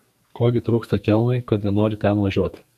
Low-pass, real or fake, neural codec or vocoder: 14.4 kHz; fake; codec, 44.1 kHz, 2.6 kbps, SNAC